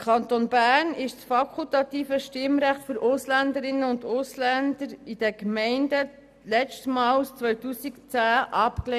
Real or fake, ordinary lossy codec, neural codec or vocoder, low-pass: real; none; none; 14.4 kHz